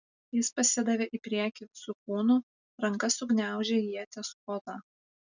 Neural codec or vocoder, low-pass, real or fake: none; 7.2 kHz; real